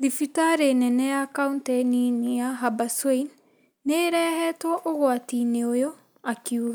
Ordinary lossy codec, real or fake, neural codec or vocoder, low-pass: none; real; none; none